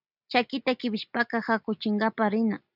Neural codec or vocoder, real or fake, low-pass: none; real; 5.4 kHz